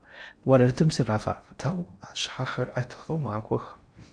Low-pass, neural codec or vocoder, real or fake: 9.9 kHz; codec, 16 kHz in and 24 kHz out, 0.6 kbps, FocalCodec, streaming, 4096 codes; fake